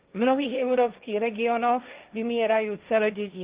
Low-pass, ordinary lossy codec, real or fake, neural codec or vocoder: 3.6 kHz; Opus, 32 kbps; fake; codec, 16 kHz, 1.1 kbps, Voila-Tokenizer